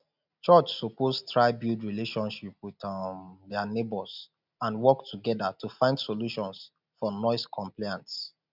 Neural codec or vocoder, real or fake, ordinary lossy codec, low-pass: none; real; none; 5.4 kHz